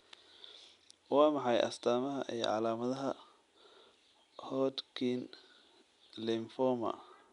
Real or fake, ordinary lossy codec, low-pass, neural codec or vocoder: real; none; 10.8 kHz; none